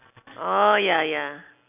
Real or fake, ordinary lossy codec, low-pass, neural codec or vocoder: real; none; 3.6 kHz; none